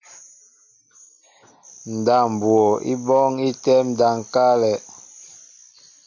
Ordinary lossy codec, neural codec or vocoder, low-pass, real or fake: Opus, 64 kbps; none; 7.2 kHz; real